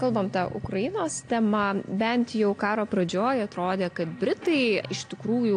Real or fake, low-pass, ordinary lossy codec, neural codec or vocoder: real; 9.9 kHz; AAC, 48 kbps; none